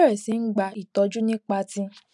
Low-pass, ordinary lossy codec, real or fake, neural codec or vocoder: 10.8 kHz; none; real; none